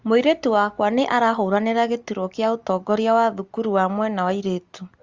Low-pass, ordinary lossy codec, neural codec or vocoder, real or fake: 7.2 kHz; Opus, 32 kbps; none; real